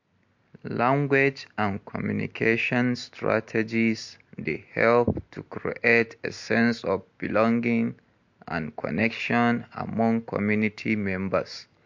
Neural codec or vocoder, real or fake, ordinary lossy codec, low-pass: none; real; MP3, 48 kbps; 7.2 kHz